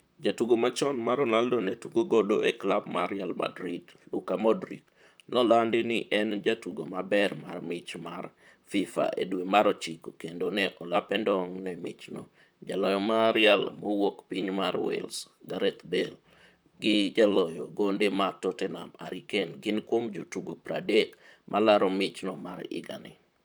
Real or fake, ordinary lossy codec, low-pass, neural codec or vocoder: fake; none; none; vocoder, 44.1 kHz, 128 mel bands, Pupu-Vocoder